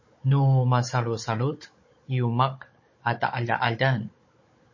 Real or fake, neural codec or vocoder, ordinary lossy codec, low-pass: fake; codec, 16 kHz, 16 kbps, FunCodec, trained on Chinese and English, 50 frames a second; MP3, 32 kbps; 7.2 kHz